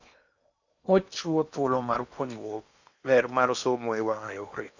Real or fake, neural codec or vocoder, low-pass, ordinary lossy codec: fake; codec, 16 kHz in and 24 kHz out, 0.8 kbps, FocalCodec, streaming, 65536 codes; 7.2 kHz; none